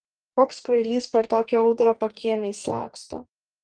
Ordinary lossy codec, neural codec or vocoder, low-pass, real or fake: Opus, 24 kbps; codec, 44.1 kHz, 2.6 kbps, DAC; 9.9 kHz; fake